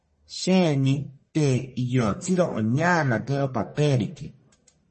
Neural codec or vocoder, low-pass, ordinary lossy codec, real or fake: codec, 44.1 kHz, 1.7 kbps, Pupu-Codec; 10.8 kHz; MP3, 32 kbps; fake